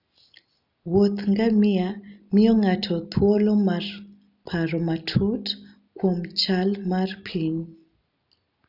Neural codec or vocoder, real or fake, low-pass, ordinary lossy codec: none; real; 5.4 kHz; none